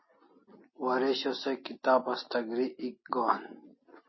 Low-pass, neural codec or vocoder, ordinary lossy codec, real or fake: 7.2 kHz; none; MP3, 24 kbps; real